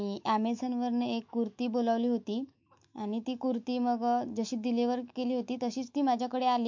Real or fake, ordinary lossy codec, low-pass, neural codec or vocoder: fake; MP3, 48 kbps; 7.2 kHz; autoencoder, 48 kHz, 128 numbers a frame, DAC-VAE, trained on Japanese speech